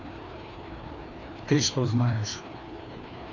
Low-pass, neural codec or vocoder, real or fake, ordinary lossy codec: 7.2 kHz; codec, 16 kHz, 2 kbps, FreqCodec, larger model; fake; AAC, 48 kbps